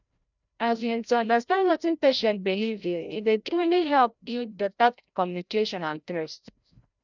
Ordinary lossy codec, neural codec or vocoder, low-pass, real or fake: none; codec, 16 kHz, 0.5 kbps, FreqCodec, larger model; 7.2 kHz; fake